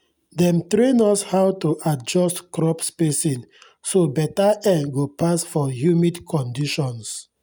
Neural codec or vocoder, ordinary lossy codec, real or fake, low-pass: none; none; real; none